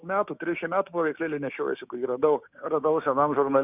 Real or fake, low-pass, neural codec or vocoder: fake; 3.6 kHz; codec, 16 kHz, 6 kbps, DAC